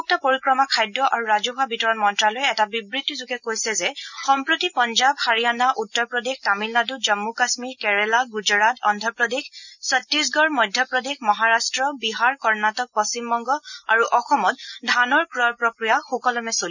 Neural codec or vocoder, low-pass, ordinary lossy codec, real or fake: none; 7.2 kHz; none; real